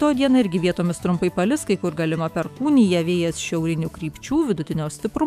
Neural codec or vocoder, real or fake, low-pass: autoencoder, 48 kHz, 128 numbers a frame, DAC-VAE, trained on Japanese speech; fake; 14.4 kHz